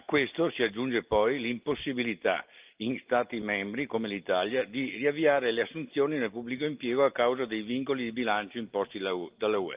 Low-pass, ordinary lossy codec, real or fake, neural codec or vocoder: 3.6 kHz; Opus, 24 kbps; real; none